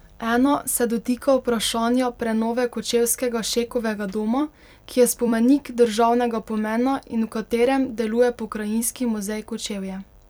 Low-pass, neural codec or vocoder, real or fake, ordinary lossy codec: 19.8 kHz; vocoder, 44.1 kHz, 128 mel bands every 256 samples, BigVGAN v2; fake; none